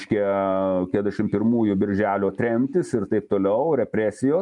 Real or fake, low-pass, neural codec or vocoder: real; 10.8 kHz; none